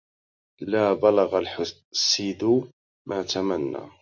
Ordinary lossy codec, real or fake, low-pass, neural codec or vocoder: MP3, 64 kbps; real; 7.2 kHz; none